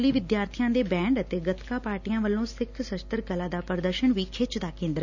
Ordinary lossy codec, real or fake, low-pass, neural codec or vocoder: none; real; 7.2 kHz; none